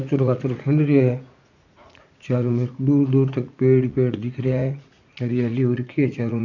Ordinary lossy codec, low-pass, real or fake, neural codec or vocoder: none; 7.2 kHz; fake; vocoder, 44.1 kHz, 80 mel bands, Vocos